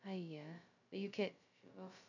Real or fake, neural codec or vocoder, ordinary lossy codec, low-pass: fake; codec, 16 kHz, 0.2 kbps, FocalCodec; none; 7.2 kHz